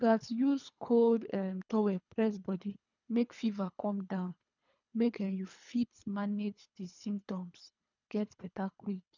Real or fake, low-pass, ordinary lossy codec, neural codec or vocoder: fake; 7.2 kHz; none; codec, 24 kHz, 3 kbps, HILCodec